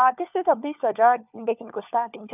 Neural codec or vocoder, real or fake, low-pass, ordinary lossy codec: codec, 16 kHz, 4 kbps, FunCodec, trained on LibriTTS, 50 frames a second; fake; 3.6 kHz; none